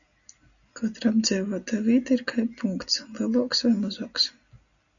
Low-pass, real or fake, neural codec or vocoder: 7.2 kHz; real; none